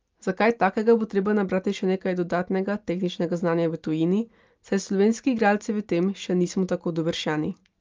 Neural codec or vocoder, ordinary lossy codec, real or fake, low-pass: none; Opus, 32 kbps; real; 7.2 kHz